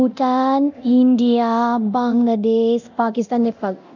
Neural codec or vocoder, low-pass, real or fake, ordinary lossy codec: codec, 24 kHz, 0.9 kbps, DualCodec; 7.2 kHz; fake; none